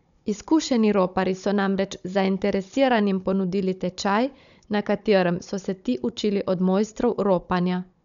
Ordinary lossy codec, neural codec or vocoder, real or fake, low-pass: none; codec, 16 kHz, 16 kbps, FunCodec, trained on Chinese and English, 50 frames a second; fake; 7.2 kHz